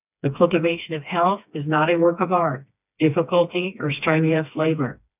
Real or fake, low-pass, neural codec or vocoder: fake; 3.6 kHz; codec, 16 kHz, 2 kbps, FreqCodec, smaller model